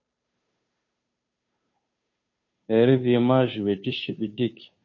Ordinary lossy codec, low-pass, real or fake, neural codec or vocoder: MP3, 32 kbps; 7.2 kHz; fake; codec, 16 kHz, 2 kbps, FunCodec, trained on Chinese and English, 25 frames a second